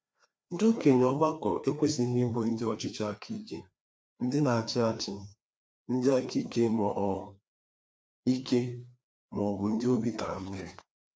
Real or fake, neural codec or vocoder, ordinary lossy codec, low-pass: fake; codec, 16 kHz, 2 kbps, FreqCodec, larger model; none; none